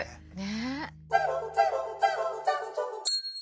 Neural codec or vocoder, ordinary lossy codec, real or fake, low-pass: none; none; real; none